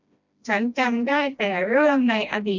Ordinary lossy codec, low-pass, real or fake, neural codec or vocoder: none; 7.2 kHz; fake; codec, 16 kHz, 1 kbps, FreqCodec, smaller model